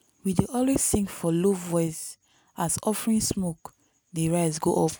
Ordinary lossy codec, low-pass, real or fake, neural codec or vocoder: none; none; real; none